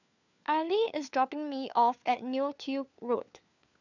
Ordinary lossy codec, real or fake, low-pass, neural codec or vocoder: none; fake; 7.2 kHz; codec, 16 kHz, 4 kbps, FunCodec, trained on LibriTTS, 50 frames a second